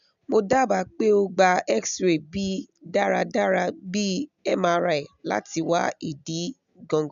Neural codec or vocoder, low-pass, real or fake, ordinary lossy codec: none; 7.2 kHz; real; none